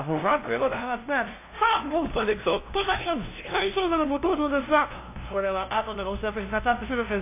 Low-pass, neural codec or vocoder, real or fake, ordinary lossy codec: 3.6 kHz; codec, 16 kHz, 0.5 kbps, FunCodec, trained on LibriTTS, 25 frames a second; fake; AAC, 24 kbps